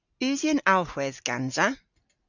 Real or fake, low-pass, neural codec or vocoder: real; 7.2 kHz; none